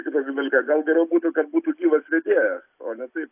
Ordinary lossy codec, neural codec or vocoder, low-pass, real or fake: AAC, 32 kbps; none; 3.6 kHz; real